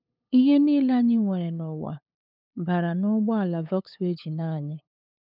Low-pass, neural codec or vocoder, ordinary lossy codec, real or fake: 5.4 kHz; codec, 16 kHz, 8 kbps, FunCodec, trained on LibriTTS, 25 frames a second; none; fake